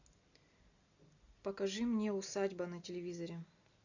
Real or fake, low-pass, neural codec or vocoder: real; 7.2 kHz; none